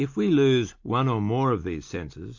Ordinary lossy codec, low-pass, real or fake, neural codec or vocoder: MP3, 48 kbps; 7.2 kHz; real; none